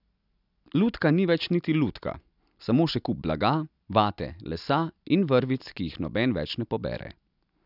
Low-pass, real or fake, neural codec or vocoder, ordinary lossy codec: 5.4 kHz; real; none; none